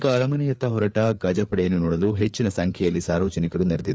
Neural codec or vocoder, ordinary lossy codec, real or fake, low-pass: codec, 16 kHz, 4 kbps, FreqCodec, larger model; none; fake; none